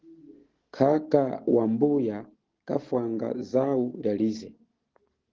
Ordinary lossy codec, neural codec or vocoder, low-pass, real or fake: Opus, 16 kbps; none; 7.2 kHz; real